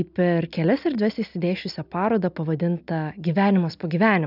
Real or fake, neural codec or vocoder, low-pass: real; none; 5.4 kHz